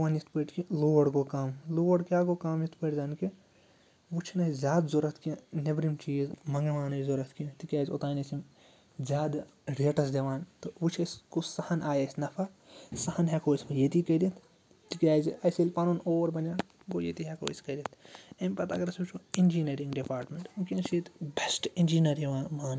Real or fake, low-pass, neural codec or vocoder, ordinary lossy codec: real; none; none; none